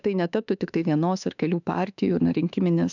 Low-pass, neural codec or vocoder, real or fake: 7.2 kHz; autoencoder, 48 kHz, 32 numbers a frame, DAC-VAE, trained on Japanese speech; fake